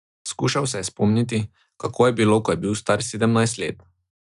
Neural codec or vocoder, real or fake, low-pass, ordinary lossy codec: none; real; 10.8 kHz; none